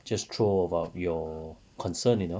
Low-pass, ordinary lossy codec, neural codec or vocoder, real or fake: none; none; none; real